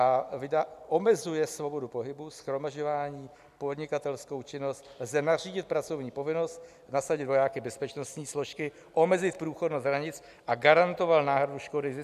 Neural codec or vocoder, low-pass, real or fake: none; 14.4 kHz; real